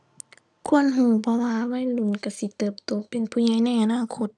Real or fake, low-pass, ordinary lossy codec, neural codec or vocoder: real; none; none; none